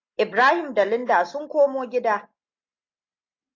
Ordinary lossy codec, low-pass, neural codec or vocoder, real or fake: AAC, 48 kbps; 7.2 kHz; none; real